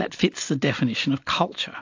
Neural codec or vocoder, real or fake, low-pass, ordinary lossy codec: none; real; 7.2 kHz; AAC, 48 kbps